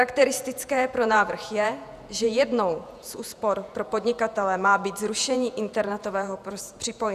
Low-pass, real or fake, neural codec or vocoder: 14.4 kHz; fake; vocoder, 48 kHz, 128 mel bands, Vocos